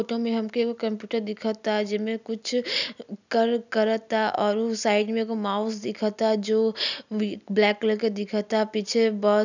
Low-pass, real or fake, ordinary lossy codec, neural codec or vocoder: 7.2 kHz; real; none; none